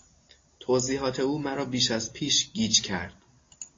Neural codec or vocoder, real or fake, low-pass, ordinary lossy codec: none; real; 7.2 kHz; AAC, 32 kbps